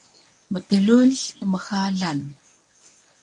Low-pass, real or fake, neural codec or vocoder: 10.8 kHz; fake; codec, 24 kHz, 0.9 kbps, WavTokenizer, medium speech release version 1